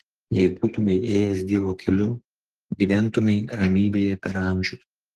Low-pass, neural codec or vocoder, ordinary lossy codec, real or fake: 14.4 kHz; codec, 32 kHz, 1.9 kbps, SNAC; Opus, 16 kbps; fake